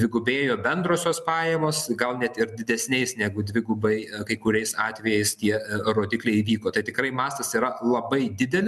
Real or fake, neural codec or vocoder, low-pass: real; none; 14.4 kHz